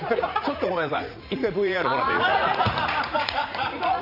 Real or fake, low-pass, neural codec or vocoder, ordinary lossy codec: fake; 5.4 kHz; vocoder, 44.1 kHz, 80 mel bands, Vocos; none